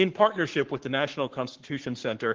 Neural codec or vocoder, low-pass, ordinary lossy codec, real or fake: codec, 44.1 kHz, 7.8 kbps, Pupu-Codec; 7.2 kHz; Opus, 16 kbps; fake